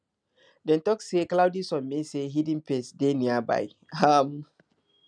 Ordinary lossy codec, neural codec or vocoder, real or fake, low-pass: none; none; real; 9.9 kHz